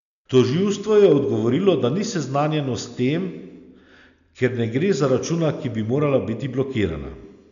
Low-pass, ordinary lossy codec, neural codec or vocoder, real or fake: 7.2 kHz; none; none; real